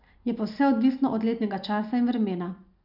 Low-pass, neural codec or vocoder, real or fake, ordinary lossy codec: 5.4 kHz; none; real; none